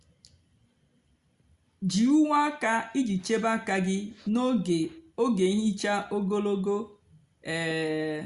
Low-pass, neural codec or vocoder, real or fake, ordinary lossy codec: 10.8 kHz; none; real; Opus, 64 kbps